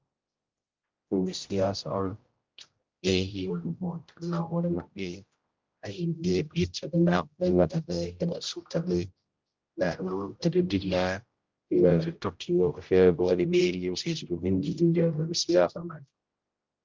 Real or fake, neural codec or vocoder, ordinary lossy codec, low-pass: fake; codec, 16 kHz, 0.5 kbps, X-Codec, HuBERT features, trained on general audio; Opus, 24 kbps; 7.2 kHz